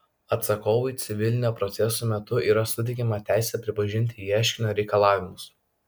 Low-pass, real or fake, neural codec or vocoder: 19.8 kHz; real; none